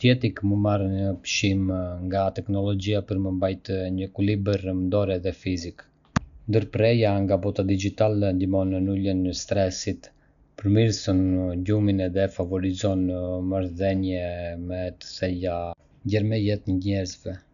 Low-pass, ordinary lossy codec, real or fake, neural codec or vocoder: 7.2 kHz; none; real; none